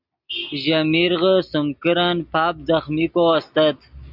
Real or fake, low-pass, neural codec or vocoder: real; 5.4 kHz; none